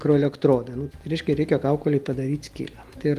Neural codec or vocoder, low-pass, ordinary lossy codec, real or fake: none; 14.4 kHz; Opus, 24 kbps; real